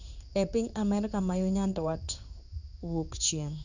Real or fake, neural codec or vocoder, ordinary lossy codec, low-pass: fake; codec, 16 kHz in and 24 kHz out, 1 kbps, XY-Tokenizer; none; 7.2 kHz